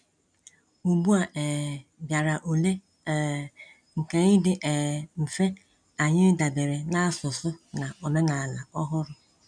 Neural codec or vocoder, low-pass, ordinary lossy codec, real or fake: none; 9.9 kHz; none; real